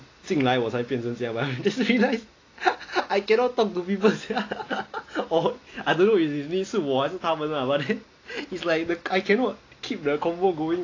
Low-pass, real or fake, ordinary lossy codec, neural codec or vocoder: 7.2 kHz; real; AAC, 32 kbps; none